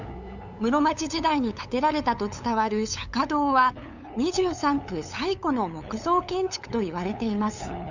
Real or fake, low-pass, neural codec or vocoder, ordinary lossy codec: fake; 7.2 kHz; codec, 16 kHz, 8 kbps, FunCodec, trained on LibriTTS, 25 frames a second; none